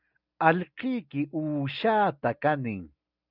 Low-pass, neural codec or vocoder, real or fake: 5.4 kHz; none; real